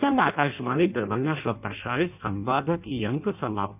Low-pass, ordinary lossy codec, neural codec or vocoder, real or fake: 3.6 kHz; none; codec, 16 kHz in and 24 kHz out, 0.6 kbps, FireRedTTS-2 codec; fake